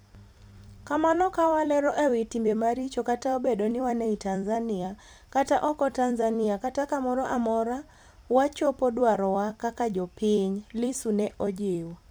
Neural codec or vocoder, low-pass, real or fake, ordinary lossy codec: vocoder, 44.1 kHz, 128 mel bands every 256 samples, BigVGAN v2; none; fake; none